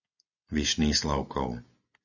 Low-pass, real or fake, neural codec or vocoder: 7.2 kHz; real; none